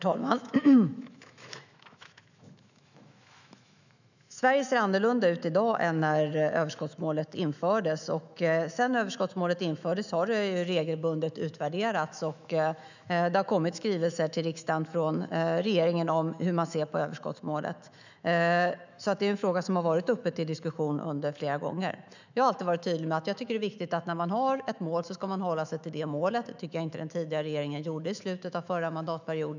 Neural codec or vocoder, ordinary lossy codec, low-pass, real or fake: autoencoder, 48 kHz, 128 numbers a frame, DAC-VAE, trained on Japanese speech; none; 7.2 kHz; fake